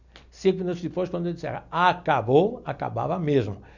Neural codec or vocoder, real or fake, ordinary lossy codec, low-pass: none; real; none; 7.2 kHz